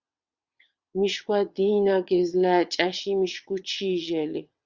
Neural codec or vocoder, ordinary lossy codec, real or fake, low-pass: vocoder, 22.05 kHz, 80 mel bands, WaveNeXt; Opus, 64 kbps; fake; 7.2 kHz